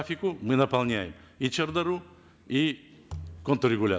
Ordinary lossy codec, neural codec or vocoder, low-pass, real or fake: none; none; none; real